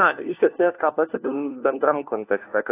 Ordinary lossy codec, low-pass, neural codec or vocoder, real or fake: AAC, 24 kbps; 3.6 kHz; codec, 16 kHz, 1 kbps, FunCodec, trained on LibriTTS, 50 frames a second; fake